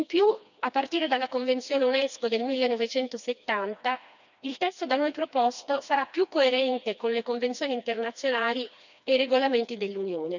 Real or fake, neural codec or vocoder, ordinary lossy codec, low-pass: fake; codec, 16 kHz, 2 kbps, FreqCodec, smaller model; none; 7.2 kHz